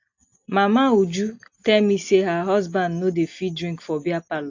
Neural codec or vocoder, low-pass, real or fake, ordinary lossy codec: none; 7.2 kHz; real; AAC, 48 kbps